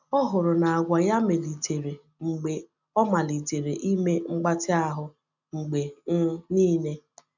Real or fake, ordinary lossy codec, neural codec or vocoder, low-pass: real; none; none; 7.2 kHz